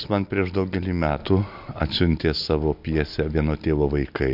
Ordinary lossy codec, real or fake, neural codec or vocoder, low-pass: MP3, 48 kbps; real; none; 5.4 kHz